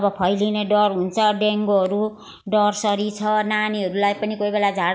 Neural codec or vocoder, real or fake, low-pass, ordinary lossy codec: none; real; none; none